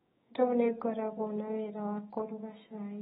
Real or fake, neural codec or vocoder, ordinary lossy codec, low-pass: fake; codec, 16 kHz, 6 kbps, DAC; AAC, 16 kbps; 7.2 kHz